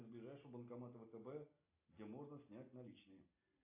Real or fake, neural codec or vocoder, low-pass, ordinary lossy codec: real; none; 3.6 kHz; AAC, 24 kbps